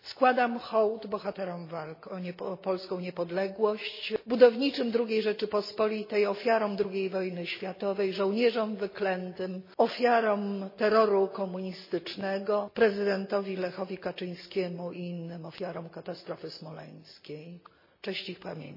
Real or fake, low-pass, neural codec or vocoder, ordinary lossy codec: real; 5.4 kHz; none; MP3, 24 kbps